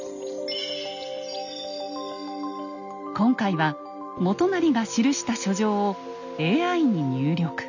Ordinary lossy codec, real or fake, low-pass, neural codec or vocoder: none; real; 7.2 kHz; none